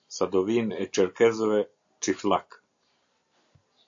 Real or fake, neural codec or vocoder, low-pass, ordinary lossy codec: real; none; 7.2 kHz; AAC, 64 kbps